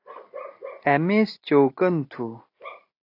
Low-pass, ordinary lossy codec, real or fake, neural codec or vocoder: 5.4 kHz; AAC, 32 kbps; real; none